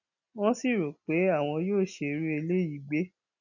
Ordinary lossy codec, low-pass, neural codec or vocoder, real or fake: none; 7.2 kHz; none; real